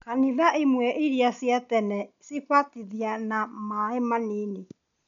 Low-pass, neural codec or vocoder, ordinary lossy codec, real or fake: 7.2 kHz; none; none; real